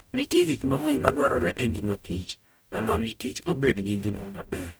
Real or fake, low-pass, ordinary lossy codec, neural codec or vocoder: fake; none; none; codec, 44.1 kHz, 0.9 kbps, DAC